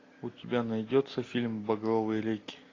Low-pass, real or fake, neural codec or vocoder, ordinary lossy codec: 7.2 kHz; real; none; AAC, 32 kbps